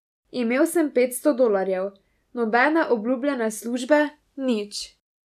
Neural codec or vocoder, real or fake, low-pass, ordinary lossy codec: none; real; 14.4 kHz; none